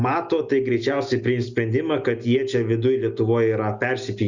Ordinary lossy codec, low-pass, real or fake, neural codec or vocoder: Opus, 64 kbps; 7.2 kHz; real; none